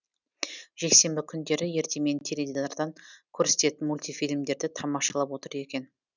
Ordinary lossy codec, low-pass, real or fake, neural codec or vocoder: none; 7.2 kHz; real; none